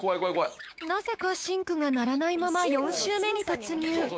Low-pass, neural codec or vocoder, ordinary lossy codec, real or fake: none; codec, 16 kHz, 6 kbps, DAC; none; fake